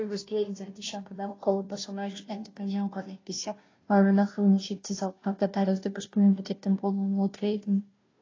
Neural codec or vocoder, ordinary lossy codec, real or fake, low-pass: codec, 16 kHz, 0.5 kbps, FunCodec, trained on Chinese and English, 25 frames a second; AAC, 32 kbps; fake; 7.2 kHz